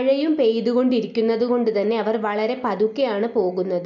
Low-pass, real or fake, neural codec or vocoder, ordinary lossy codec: 7.2 kHz; real; none; none